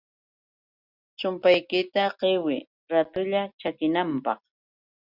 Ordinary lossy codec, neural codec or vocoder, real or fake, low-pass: Opus, 64 kbps; none; real; 5.4 kHz